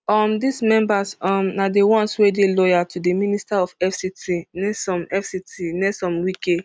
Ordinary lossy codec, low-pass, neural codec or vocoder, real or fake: none; none; none; real